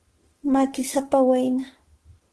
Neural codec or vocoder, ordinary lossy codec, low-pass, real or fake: autoencoder, 48 kHz, 32 numbers a frame, DAC-VAE, trained on Japanese speech; Opus, 16 kbps; 10.8 kHz; fake